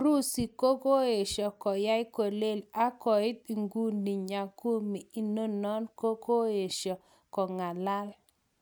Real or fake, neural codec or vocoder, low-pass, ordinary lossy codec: real; none; none; none